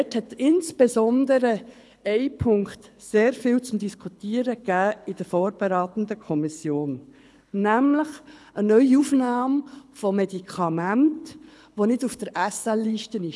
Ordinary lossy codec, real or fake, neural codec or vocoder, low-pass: none; fake; codec, 24 kHz, 6 kbps, HILCodec; none